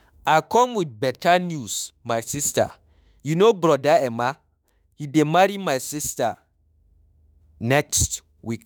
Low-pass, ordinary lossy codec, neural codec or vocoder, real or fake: none; none; autoencoder, 48 kHz, 32 numbers a frame, DAC-VAE, trained on Japanese speech; fake